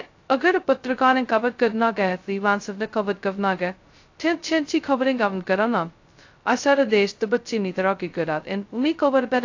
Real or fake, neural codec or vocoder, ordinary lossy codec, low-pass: fake; codec, 16 kHz, 0.2 kbps, FocalCodec; AAC, 48 kbps; 7.2 kHz